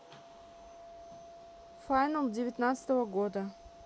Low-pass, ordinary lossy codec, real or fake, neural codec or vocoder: none; none; real; none